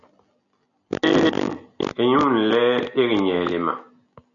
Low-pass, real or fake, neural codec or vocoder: 7.2 kHz; real; none